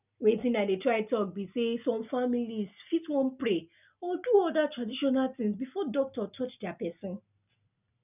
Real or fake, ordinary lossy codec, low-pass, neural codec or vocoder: real; none; 3.6 kHz; none